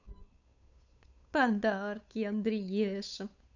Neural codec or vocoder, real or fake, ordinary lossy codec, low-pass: codec, 16 kHz, 8 kbps, FunCodec, trained on Chinese and English, 25 frames a second; fake; none; 7.2 kHz